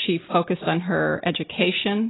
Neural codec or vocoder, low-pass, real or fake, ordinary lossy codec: none; 7.2 kHz; real; AAC, 16 kbps